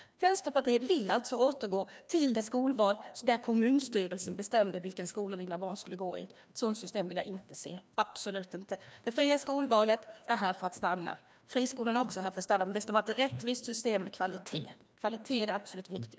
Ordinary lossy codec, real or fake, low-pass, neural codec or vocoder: none; fake; none; codec, 16 kHz, 1 kbps, FreqCodec, larger model